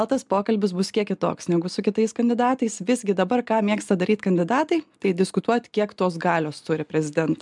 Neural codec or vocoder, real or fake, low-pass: none; real; 10.8 kHz